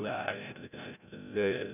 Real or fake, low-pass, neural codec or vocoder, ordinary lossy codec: fake; 3.6 kHz; codec, 16 kHz, 0.5 kbps, FreqCodec, larger model; none